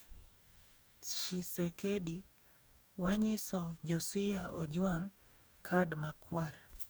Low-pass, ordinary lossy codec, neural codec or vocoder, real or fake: none; none; codec, 44.1 kHz, 2.6 kbps, DAC; fake